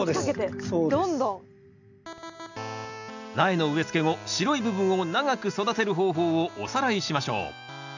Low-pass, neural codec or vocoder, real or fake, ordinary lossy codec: 7.2 kHz; none; real; none